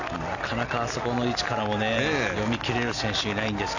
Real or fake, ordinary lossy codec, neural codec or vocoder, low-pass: real; none; none; 7.2 kHz